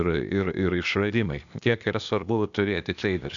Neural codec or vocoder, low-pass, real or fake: codec, 16 kHz, 0.8 kbps, ZipCodec; 7.2 kHz; fake